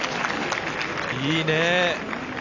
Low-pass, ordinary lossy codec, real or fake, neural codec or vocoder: 7.2 kHz; Opus, 64 kbps; real; none